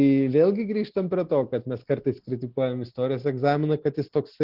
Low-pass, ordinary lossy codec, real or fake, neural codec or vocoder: 5.4 kHz; Opus, 16 kbps; real; none